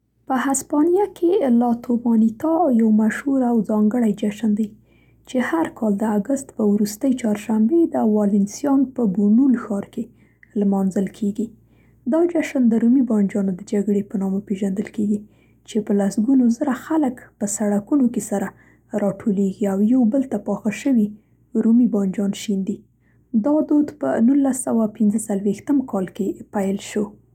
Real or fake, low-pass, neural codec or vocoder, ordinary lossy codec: real; 19.8 kHz; none; none